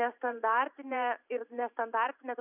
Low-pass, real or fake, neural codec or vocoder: 3.6 kHz; fake; vocoder, 44.1 kHz, 128 mel bands every 512 samples, BigVGAN v2